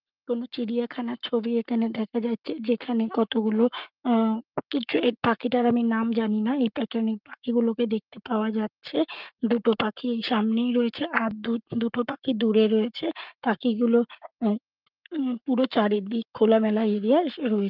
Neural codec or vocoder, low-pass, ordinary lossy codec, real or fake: codec, 44.1 kHz, 7.8 kbps, Pupu-Codec; 5.4 kHz; Opus, 24 kbps; fake